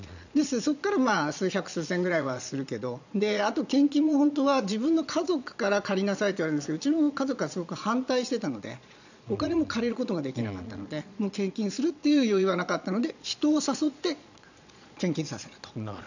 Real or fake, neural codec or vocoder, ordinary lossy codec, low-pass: fake; vocoder, 22.05 kHz, 80 mel bands, Vocos; none; 7.2 kHz